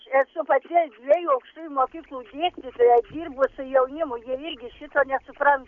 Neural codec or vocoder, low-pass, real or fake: none; 7.2 kHz; real